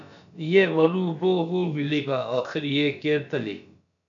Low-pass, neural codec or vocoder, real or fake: 7.2 kHz; codec, 16 kHz, about 1 kbps, DyCAST, with the encoder's durations; fake